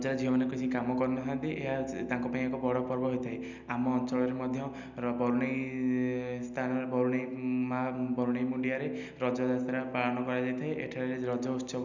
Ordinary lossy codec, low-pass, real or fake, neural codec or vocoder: none; 7.2 kHz; real; none